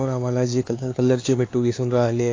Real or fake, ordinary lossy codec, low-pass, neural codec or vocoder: fake; AAC, 32 kbps; 7.2 kHz; codec, 16 kHz, 4 kbps, X-Codec, HuBERT features, trained on LibriSpeech